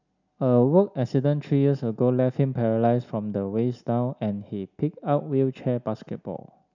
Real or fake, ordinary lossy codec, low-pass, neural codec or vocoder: real; none; 7.2 kHz; none